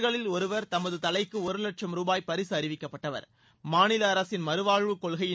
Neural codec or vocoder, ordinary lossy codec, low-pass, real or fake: none; none; none; real